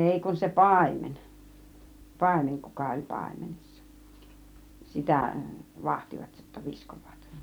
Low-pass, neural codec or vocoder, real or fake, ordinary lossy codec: none; vocoder, 44.1 kHz, 128 mel bands every 256 samples, BigVGAN v2; fake; none